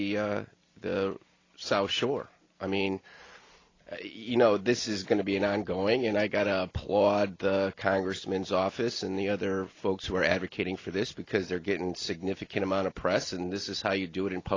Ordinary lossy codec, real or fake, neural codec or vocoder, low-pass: AAC, 32 kbps; real; none; 7.2 kHz